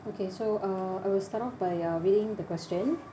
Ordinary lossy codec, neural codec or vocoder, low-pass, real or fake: none; none; none; real